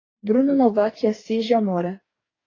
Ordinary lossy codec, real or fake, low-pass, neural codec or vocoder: AAC, 32 kbps; fake; 7.2 kHz; codec, 44.1 kHz, 2.6 kbps, DAC